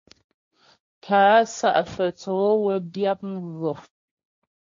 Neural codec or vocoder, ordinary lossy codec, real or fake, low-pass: codec, 16 kHz, 1.1 kbps, Voila-Tokenizer; MP3, 48 kbps; fake; 7.2 kHz